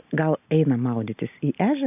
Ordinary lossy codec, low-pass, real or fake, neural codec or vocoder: AAC, 32 kbps; 3.6 kHz; real; none